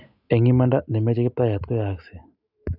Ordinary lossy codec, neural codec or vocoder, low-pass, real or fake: none; none; 5.4 kHz; real